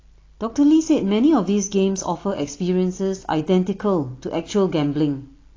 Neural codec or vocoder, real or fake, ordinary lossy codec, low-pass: none; real; AAC, 32 kbps; 7.2 kHz